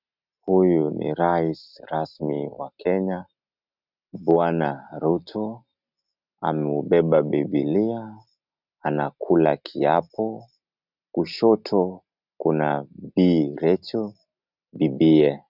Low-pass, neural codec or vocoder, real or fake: 5.4 kHz; none; real